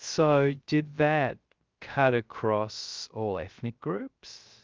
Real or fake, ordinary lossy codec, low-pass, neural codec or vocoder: fake; Opus, 24 kbps; 7.2 kHz; codec, 16 kHz, 0.3 kbps, FocalCodec